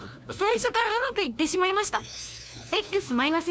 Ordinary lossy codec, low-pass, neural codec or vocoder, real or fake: none; none; codec, 16 kHz, 1 kbps, FunCodec, trained on LibriTTS, 50 frames a second; fake